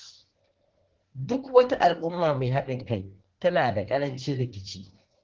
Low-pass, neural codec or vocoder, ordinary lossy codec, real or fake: 7.2 kHz; codec, 24 kHz, 1 kbps, SNAC; Opus, 16 kbps; fake